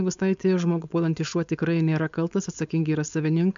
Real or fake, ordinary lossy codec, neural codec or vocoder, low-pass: fake; MP3, 64 kbps; codec, 16 kHz, 4.8 kbps, FACodec; 7.2 kHz